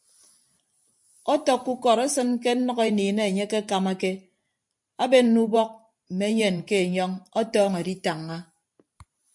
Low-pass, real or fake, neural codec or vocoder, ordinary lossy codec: 10.8 kHz; fake; vocoder, 44.1 kHz, 128 mel bands every 512 samples, BigVGAN v2; MP3, 64 kbps